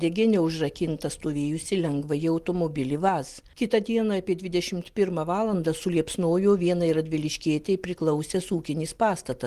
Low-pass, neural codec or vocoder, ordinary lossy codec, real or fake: 14.4 kHz; none; Opus, 24 kbps; real